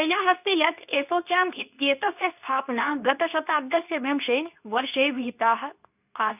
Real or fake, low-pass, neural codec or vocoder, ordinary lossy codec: fake; 3.6 kHz; codec, 24 kHz, 0.9 kbps, WavTokenizer, medium speech release version 2; none